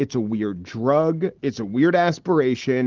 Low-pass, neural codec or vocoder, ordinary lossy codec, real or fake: 7.2 kHz; none; Opus, 16 kbps; real